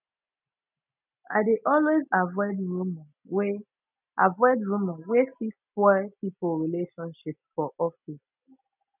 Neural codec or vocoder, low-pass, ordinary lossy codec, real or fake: none; 3.6 kHz; none; real